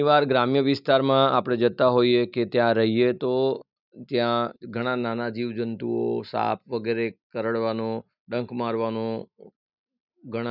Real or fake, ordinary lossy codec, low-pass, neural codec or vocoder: real; none; 5.4 kHz; none